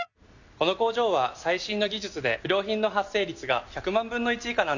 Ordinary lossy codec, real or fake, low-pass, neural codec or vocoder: none; real; 7.2 kHz; none